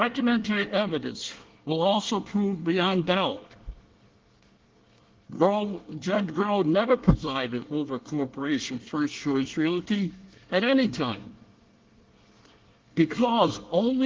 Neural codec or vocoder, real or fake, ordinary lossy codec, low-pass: codec, 24 kHz, 1 kbps, SNAC; fake; Opus, 16 kbps; 7.2 kHz